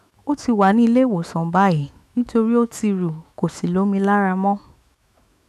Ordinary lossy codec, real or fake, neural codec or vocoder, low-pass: none; fake; autoencoder, 48 kHz, 128 numbers a frame, DAC-VAE, trained on Japanese speech; 14.4 kHz